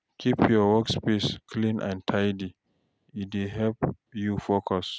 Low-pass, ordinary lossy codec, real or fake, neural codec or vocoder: none; none; real; none